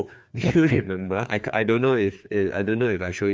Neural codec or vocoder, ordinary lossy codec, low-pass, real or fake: codec, 16 kHz, 2 kbps, FreqCodec, larger model; none; none; fake